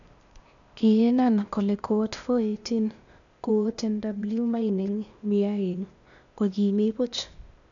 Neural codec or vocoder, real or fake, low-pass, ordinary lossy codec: codec, 16 kHz, 0.8 kbps, ZipCodec; fake; 7.2 kHz; none